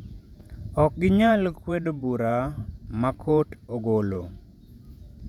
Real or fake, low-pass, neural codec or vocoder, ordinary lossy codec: fake; 19.8 kHz; vocoder, 48 kHz, 128 mel bands, Vocos; none